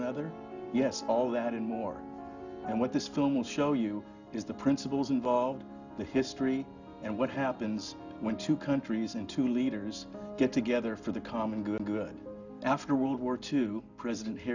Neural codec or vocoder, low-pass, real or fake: none; 7.2 kHz; real